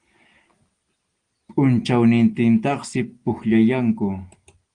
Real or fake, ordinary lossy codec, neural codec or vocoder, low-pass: real; Opus, 24 kbps; none; 9.9 kHz